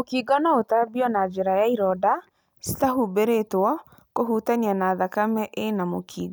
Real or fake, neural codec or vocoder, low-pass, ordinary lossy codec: real; none; none; none